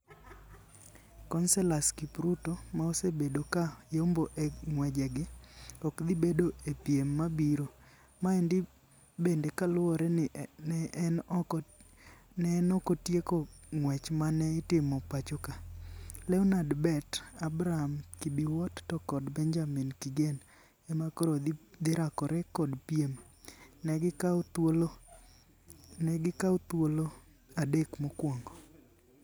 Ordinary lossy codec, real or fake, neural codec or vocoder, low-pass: none; real; none; none